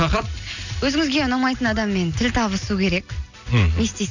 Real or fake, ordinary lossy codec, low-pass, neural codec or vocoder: real; none; 7.2 kHz; none